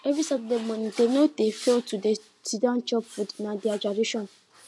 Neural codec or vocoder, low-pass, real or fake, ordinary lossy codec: none; none; real; none